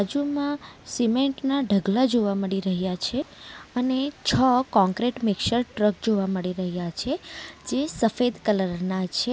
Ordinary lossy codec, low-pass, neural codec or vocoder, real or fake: none; none; none; real